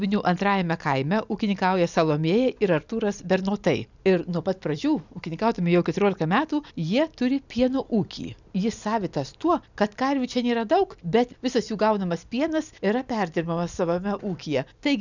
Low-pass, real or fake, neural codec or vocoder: 7.2 kHz; real; none